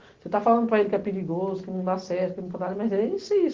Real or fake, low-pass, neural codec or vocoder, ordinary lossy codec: real; 7.2 kHz; none; Opus, 16 kbps